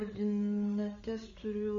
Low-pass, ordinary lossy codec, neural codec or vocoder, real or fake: 7.2 kHz; MP3, 32 kbps; codec, 16 kHz, 8 kbps, FreqCodec, larger model; fake